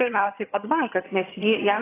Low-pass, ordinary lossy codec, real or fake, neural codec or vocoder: 3.6 kHz; AAC, 16 kbps; fake; vocoder, 22.05 kHz, 80 mel bands, HiFi-GAN